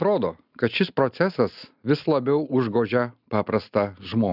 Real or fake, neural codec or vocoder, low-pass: real; none; 5.4 kHz